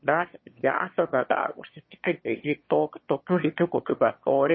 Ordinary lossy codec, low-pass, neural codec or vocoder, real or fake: MP3, 24 kbps; 7.2 kHz; autoencoder, 22.05 kHz, a latent of 192 numbers a frame, VITS, trained on one speaker; fake